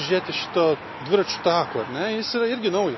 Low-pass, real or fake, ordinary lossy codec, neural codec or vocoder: 7.2 kHz; real; MP3, 24 kbps; none